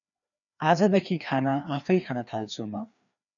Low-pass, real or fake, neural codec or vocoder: 7.2 kHz; fake; codec, 16 kHz, 2 kbps, FreqCodec, larger model